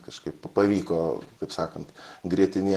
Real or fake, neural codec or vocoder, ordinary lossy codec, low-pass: fake; vocoder, 48 kHz, 128 mel bands, Vocos; Opus, 16 kbps; 14.4 kHz